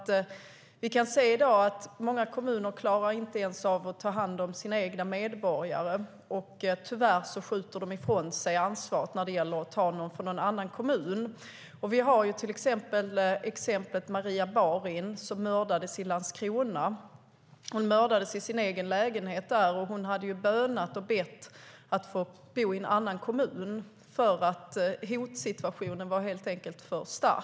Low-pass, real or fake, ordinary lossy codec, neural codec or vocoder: none; real; none; none